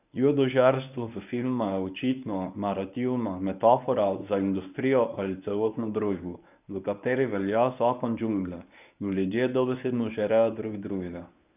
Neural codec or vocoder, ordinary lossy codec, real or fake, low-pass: codec, 24 kHz, 0.9 kbps, WavTokenizer, medium speech release version 1; none; fake; 3.6 kHz